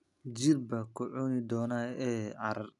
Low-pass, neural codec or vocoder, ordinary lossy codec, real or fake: 10.8 kHz; none; none; real